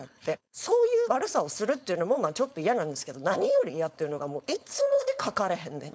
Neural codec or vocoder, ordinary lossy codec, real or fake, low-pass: codec, 16 kHz, 4.8 kbps, FACodec; none; fake; none